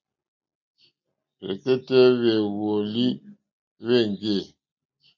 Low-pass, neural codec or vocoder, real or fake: 7.2 kHz; none; real